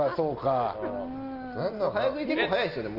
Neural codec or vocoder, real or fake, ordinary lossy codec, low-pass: none; real; Opus, 32 kbps; 5.4 kHz